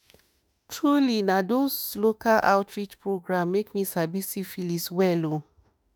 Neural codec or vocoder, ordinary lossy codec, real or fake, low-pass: autoencoder, 48 kHz, 32 numbers a frame, DAC-VAE, trained on Japanese speech; none; fake; none